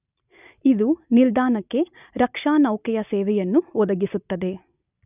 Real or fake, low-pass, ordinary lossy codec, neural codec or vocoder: real; 3.6 kHz; none; none